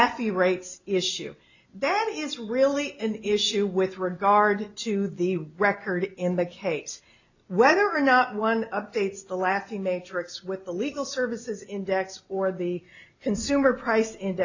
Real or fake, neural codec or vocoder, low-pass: real; none; 7.2 kHz